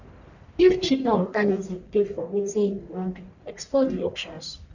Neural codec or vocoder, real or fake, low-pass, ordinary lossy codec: codec, 44.1 kHz, 1.7 kbps, Pupu-Codec; fake; 7.2 kHz; none